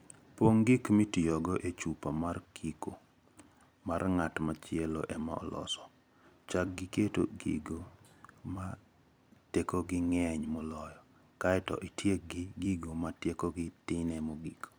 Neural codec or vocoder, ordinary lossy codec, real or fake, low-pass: vocoder, 44.1 kHz, 128 mel bands every 256 samples, BigVGAN v2; none; fake; none